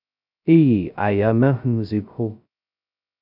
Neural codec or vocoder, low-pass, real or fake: codec, 16 kHz, 0.2 kbps, FocalCodec; 5.4 kHz; fake